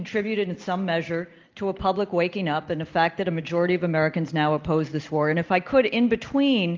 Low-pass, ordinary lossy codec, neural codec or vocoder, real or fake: 7.2 kHz; Opus, 24 kbps; none; real